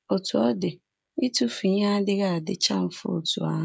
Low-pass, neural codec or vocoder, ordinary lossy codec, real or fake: none; codec, 16 kHz, 16 kbps, FreqCodec, smaller model; none; fake